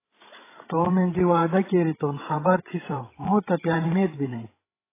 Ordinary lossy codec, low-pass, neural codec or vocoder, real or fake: AAC, 16 kbps; 3.6 kHz; codec, 16 kHz, 16 kbps, FreqCodec, larger model; fake